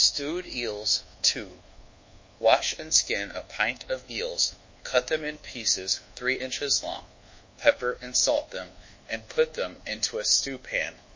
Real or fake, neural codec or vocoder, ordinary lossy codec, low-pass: fake; codec, 24 kHz, 1.2 kbps, DualCodec; MP3, 32 kbps; 7.2 kHz